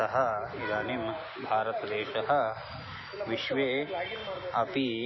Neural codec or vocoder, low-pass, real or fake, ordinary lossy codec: none; 7.2 kHz; real; MP3, 24 kbps